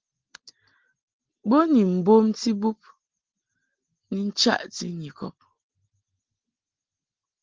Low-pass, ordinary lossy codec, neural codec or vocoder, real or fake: 7.2 kHz; Opus, 16 kbps; none; real